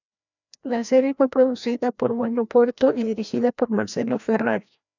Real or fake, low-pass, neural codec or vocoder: fake; 7.2 kHz; codec, 16 kHz, 1 kbps, FreqCodec, larger model